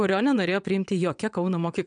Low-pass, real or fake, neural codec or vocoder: 9.9 kHz; fake; vocoder, 22.05 kHz, 80 mel bands, WaveNeXt